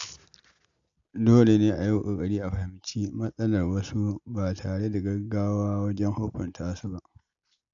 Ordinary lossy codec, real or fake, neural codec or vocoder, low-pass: AAC, 64 kbps; real; none; 7.2 kHz